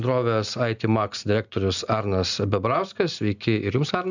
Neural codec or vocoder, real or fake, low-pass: none; real; 7.2 kHz